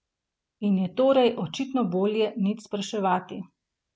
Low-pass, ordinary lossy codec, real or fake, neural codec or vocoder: none; none; real; none